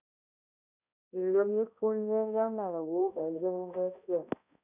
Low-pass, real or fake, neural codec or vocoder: 3.6 kHz; fake; codec, 16 kHz, 0.5 kbps, X-Codec, HuBERT features, trained on balanced general audio